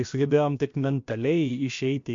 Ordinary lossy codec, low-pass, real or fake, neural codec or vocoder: MP3, 48 kbps; 7.2 kHz; fake; codec, 16 kHz, about 1 kbps, DyCAST, with the encoder's durations